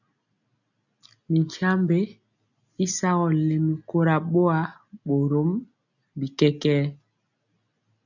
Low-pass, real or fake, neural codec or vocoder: 7.2 kHz; real; none